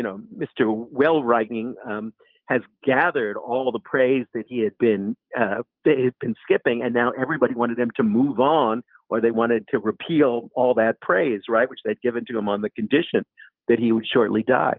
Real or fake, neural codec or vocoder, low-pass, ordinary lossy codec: real; none; 5.4 kHz; Opus, 32 kbps